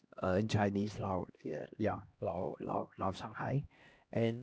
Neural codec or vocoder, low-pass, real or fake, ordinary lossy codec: codec, 16 kHz, 1 kbps, X-Codec, HuBERT features, trained on LibriSpeech; none; fake; none